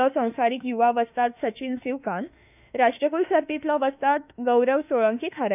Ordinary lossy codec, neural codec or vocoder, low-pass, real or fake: none; autoencoder, 48 kHz, 32 numbers a frame, DAC-VAE, trained on Japanese speech; 3.6 kHz; fake